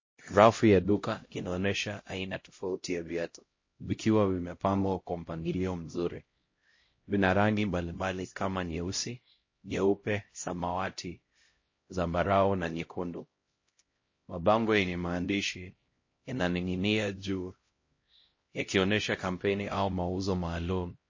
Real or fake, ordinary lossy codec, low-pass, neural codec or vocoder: fake; MP3, 32 kbps; 7.2 kHz; codec, 16 kHz, 0.5 kbps, X-Codec, HuBERT features, trained on LibriSpeech